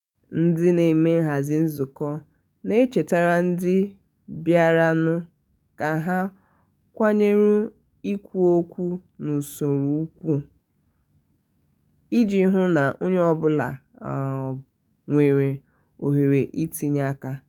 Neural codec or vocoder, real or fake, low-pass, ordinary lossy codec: codec, 44.1 kHz, 7.8 kbps, DAC; fake; 19.8 kHz; none